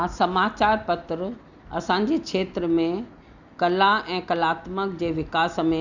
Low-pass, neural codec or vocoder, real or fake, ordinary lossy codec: 7.2 kHz; none; real; none